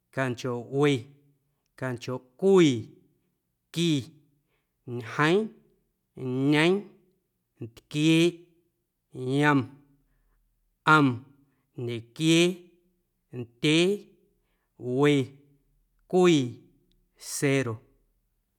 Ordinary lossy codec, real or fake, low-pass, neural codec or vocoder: none; real; 19.8 kHz; none